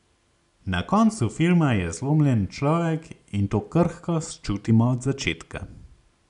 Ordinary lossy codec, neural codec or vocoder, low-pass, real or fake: none; none; 10.8 kHz; real